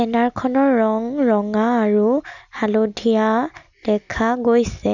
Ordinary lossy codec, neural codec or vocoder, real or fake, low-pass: none; none; real; 7.2 kHz